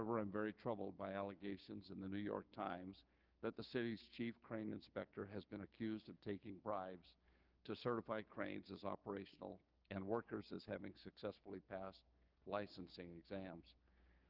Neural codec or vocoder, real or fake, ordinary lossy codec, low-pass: codec, 16 kHz, 6 kbps, DAC; fake; Opus, 32 kbps; 5.4 kHz